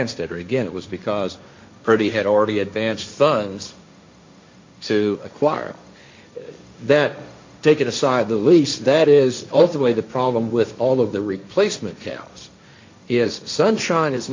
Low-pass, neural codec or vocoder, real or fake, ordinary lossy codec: 7.2 kHz; codec, 16 kHz, 1.1 kbps, Voila-Tokenizer; fake; MP3, 48 kbps